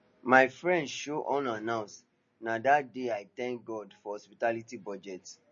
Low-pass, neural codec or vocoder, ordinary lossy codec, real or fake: 7.2 kHz; none; MP3, 32 kbps; real